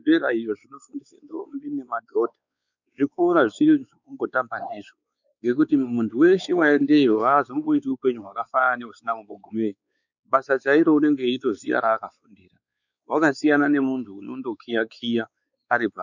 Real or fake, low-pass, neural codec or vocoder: fake; 7.2 kHz; codec, 16 kHz, 4 kbps, X-Codec, WavLM features, trained on Multilingual LibriSpeech